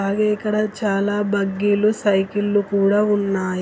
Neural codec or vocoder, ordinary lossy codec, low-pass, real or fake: none; none; none; real